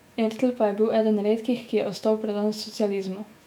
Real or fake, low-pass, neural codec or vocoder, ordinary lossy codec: fake; 19.8 kHz; autoencoder, 48 kHz, 128 numbers a frame, DAC-VAE, trained on Japanese speech; none